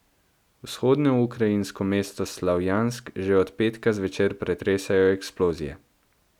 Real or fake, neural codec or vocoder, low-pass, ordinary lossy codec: real; none; 19.8 kHz; none